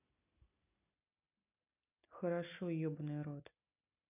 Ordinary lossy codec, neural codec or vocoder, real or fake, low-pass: none; none; real; 3.6 kHz